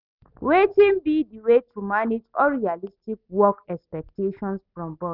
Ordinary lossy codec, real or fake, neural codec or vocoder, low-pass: none; real; none; 5.4 kHz